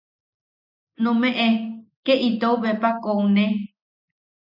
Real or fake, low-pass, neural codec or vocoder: real; 5.4 kHz; none